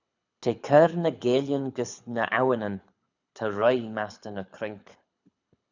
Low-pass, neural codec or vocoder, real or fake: 7.2 kHz; codec, 24 kHz, 6 kbps, HILCodec; fake